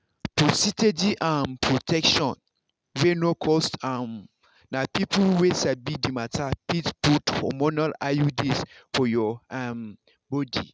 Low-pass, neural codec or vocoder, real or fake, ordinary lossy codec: none; none; real; none